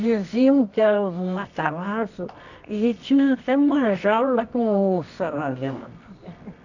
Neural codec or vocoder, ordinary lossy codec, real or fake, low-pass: codec, 24 kHz, 0.9 kbps, WavTokenizer, medium music audio release; none; fake; 7.2 kHz